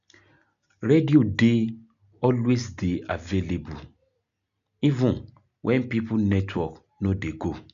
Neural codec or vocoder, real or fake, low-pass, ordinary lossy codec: none; real; 7.2 kHz; none